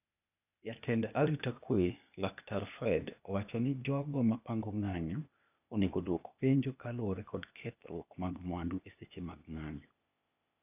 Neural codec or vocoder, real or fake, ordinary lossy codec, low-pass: codec, 16 kHz, 0.8 kbps, ZipCodec; fake; none; 3.6 kHz